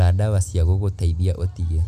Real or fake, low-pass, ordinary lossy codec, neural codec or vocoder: real; 14.4 kHz; none; none